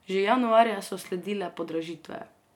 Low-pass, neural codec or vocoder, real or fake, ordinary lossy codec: 19.8 kHz; none; real; MP3, 96 kbps